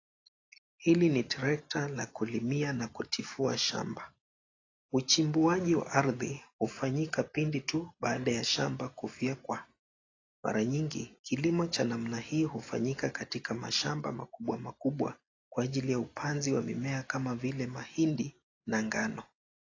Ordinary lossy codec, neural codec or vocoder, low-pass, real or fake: AAC, 32 kbps; none; 7.2 kHz; real